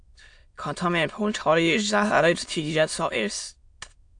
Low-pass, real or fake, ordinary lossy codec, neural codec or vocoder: 9.9 kHz; fake; AAC, 64 kbps; autoencoder, 22.05 kHz, a latent of 192 numbers a frame, VITS, trained on many speakers